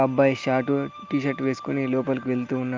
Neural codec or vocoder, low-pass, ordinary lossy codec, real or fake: none; 7.2 kHz; Opus, 24 kbps; real